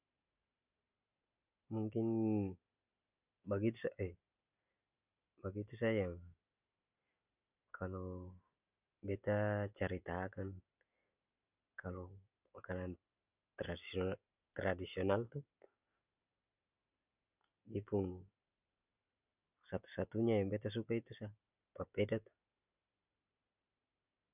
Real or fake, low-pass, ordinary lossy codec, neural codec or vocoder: real; 3.6 kHz; none; none